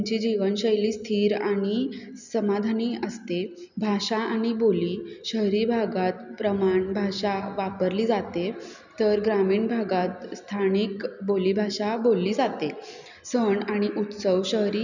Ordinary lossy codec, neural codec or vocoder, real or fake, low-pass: none; none; real; 7.2 kHz